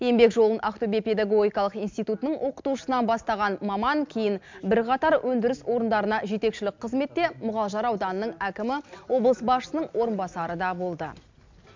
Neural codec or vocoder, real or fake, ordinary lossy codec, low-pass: none; real; MP3, 64 kbps; 7.2 kHz